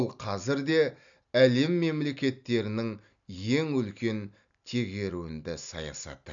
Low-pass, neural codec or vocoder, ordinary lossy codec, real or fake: 7.2 kHz; none; none; real